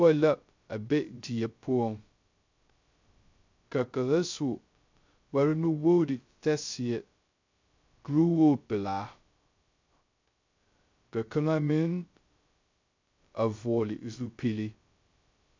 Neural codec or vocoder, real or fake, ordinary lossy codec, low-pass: codec, 16 kHz, 0.2 kbps, FocalCodec; fake; MP3, 64 kbps; 7.2 kHz